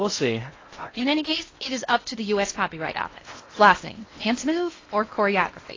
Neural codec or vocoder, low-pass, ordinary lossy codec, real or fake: codec, 16 kHz in and 24 kHz out, 0.8 kbps, FocalCodec, streaming, 65536 codes; 7.2 kHz; AAC, 32 kbps; fake